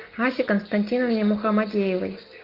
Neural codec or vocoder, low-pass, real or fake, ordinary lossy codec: none; 5.4 kHz; real; Opus, 32 kbps